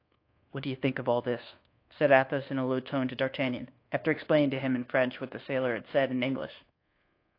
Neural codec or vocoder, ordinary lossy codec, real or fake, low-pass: codec, 24 kHz, 1.2 kbps, DualCodec; AAC, 32 kbps; fake; 5.4 kHz